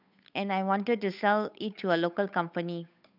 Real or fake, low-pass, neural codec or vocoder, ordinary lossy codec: fake; 5.4 kHz; codec, 16 kHz, 8 kbps, FunCodec, trained on Chinese and English, 25 frames a second; none